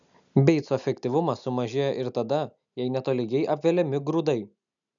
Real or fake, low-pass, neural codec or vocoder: real; 7.2 kHz; none